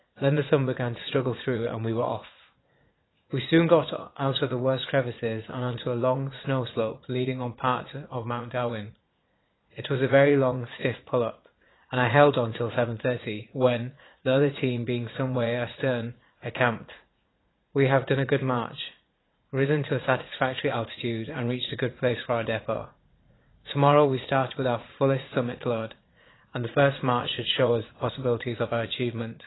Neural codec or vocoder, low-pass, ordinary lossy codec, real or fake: vocoder, 44.1 kHz, 80 mel bands, Vocos; 7.2 kHz; AAC, 16 kbps; fake